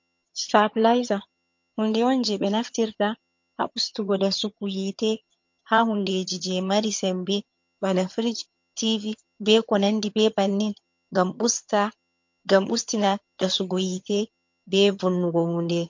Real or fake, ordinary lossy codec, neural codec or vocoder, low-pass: fake; MP3, 48 kbps; vocoder, 22.05 kHz, 80 mel bands, HiFi-GAN; 7.2 kHz